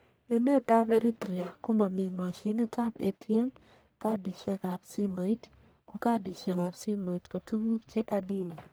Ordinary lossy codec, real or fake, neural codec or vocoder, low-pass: none; fake; codec, 44.1 kHz, 1.7 kbps, Pupu-Codec; none